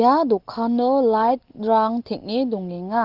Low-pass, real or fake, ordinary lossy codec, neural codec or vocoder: 5.4 kHz; real; Opus, 16 kbps; none